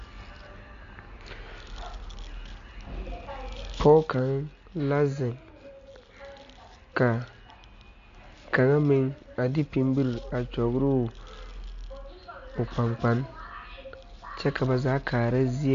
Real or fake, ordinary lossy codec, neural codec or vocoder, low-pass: real; MP3, 48 kbps; none; 7.2 kHz